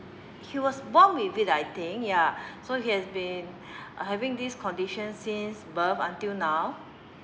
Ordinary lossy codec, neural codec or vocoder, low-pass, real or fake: none; none; none; real